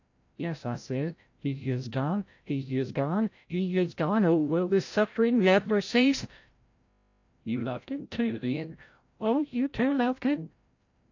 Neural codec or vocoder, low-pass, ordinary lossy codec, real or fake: codec, 16 kHz, 0.5 kbps, FreqCodec, larger model; 7.2 kHz; AAC, 48 kbps; fake